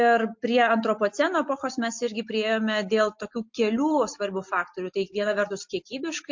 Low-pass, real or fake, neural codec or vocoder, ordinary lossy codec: 7.2 kHz; real; none; MP3, 48 kbps